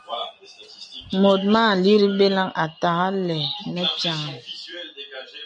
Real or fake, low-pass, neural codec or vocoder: real; 9.9 kHz; none